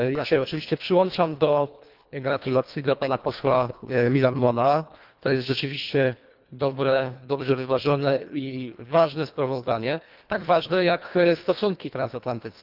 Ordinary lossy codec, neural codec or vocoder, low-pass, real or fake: Opus, 24 kbps; codec, 24 kHz, 1.5 kbps, HILCodec; 5.4 kHz; fake